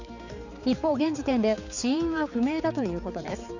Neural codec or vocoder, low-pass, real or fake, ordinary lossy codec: codec, 16 kHz, 4 kbps, X-Codec, HuBERT features, trained on balanced general audio; 7.2 kHz; fake; none